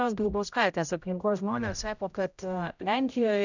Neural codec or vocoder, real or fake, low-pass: codec, 16 kHz, 0.5 kbps, X-Codec, HuBERT features, trained on general audio; fake; 7.2 kHz